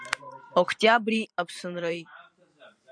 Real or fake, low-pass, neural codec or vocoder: fake; 9.9 kHz; vocoder, 44.1 kHz, 128 mel bands every 512 samples, BigVGAN v2